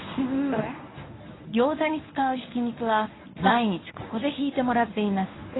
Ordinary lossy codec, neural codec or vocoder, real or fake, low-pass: AAC, 16 kbps; codec, 24 kHz, 0.9 kbps, WavTokenizer, medium speech release version 1; fake; 7.2 kHz